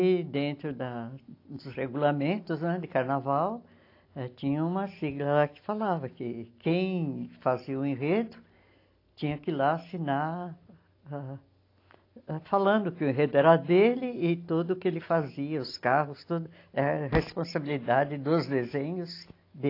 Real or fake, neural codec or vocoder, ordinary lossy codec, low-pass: real; none; AAC, 32 kbps; 5.4 kHz